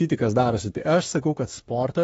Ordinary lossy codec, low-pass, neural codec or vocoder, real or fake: AAC, 24 kbps; 19.8 kHz; autoencoder, 48 kHz, 32 numbers a frame, DAC-VAE, trained on Japanese speech; fake